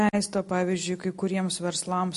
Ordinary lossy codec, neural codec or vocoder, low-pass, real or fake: MP3, 48 kbps; none; 14.4 kHz; real